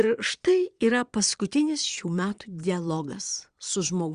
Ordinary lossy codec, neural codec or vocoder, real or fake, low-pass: Opus, 64 kbps; vocoder, 22.05 kHz, 80 mel bands, Vocos; fake; 9.9 kHz